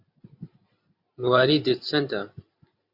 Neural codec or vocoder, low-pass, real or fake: vocoder, 44.1 kHz, 128 mel bands every 512 samples, BigVGAN v2; 5.4 kHz; fake